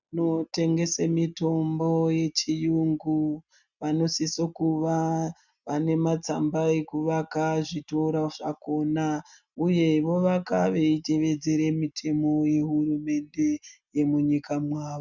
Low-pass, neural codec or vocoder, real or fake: 7.2 kHz; none; real